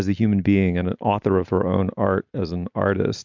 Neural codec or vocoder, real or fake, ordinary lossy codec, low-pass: none; real; MP3, 64 kbps; 7.2 kHz